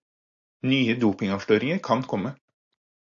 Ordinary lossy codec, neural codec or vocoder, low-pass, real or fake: AAC, 64 kbps; none; 7.2 kHz; real